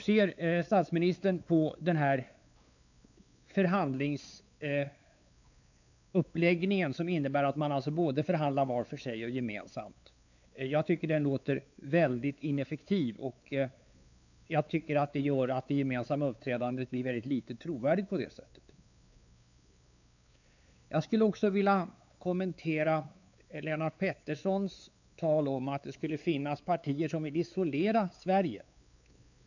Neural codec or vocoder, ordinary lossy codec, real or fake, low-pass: codec, 16 kHz, 4 kbps, X-Codec, WavLM features, trained on Multilingual LibriSpeech; none; fake; 7.2 kHz